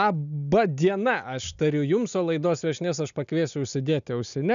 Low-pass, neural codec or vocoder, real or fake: 7.2 kHz; none; real